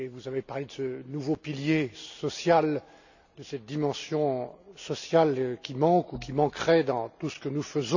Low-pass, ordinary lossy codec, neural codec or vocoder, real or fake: 7.2 kHz; none; none; real